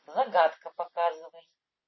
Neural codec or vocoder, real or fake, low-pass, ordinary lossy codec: none; real; 7.2 kHz; MP3, 24 kbps